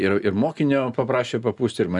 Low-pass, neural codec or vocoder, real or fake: 10.8 kHz; none; real